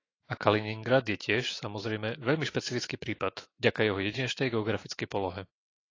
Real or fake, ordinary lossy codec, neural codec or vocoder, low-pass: fake; AAC, 32 kbps; autoencoder, 48 kHz, 128 numbers a frame, DAC-VAE, trained on Japanese speech; 7.2 kHz